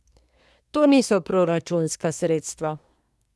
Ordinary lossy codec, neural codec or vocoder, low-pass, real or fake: none; codec, 24 kHz, 1 kbps, SNAC; none; fake